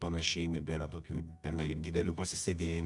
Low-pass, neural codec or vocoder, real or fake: 10.8 kHz; codec, 24 kHz, 0.9 kbps, WavTokenizer, medium music audio release; fake